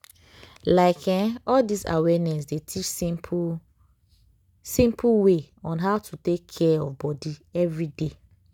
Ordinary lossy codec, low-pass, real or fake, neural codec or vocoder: none; 19.8 kHz; real; none